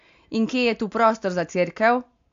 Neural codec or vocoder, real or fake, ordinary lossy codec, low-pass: none; real; AAC, 64 kbps; 7.2 kHz